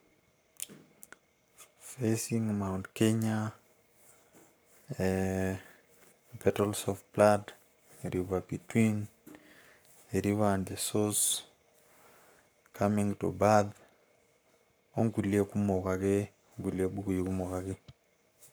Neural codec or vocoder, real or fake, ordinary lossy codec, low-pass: codec, 44.1 kHz, 7.8 kbps, Pupu-Codec; fake; none; none